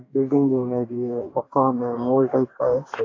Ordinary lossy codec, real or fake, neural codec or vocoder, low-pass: AAC, 32 kbps; fake; codec, 44.1 kHz, 2.6 kbps, DAC; 7.2 kHz